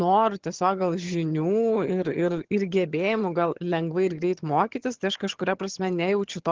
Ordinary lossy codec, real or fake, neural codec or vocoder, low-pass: Opus, 16 kbps; fake; vocoder, 22.05 kHz, 80 mel bands, HiFi-GAN; 7.2 kHz